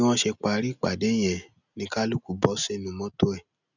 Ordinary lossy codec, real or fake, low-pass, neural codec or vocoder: none; real; 7.2 kHz; none